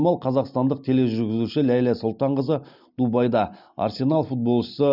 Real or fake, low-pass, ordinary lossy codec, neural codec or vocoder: real; 5.4 kHz; none; none